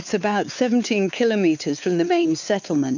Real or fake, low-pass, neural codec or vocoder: fake; 7.2 kHz; codec, 16 kHz, 4 kbps, X-Codec, WavLM features, trained on Multilingual LibriSpeech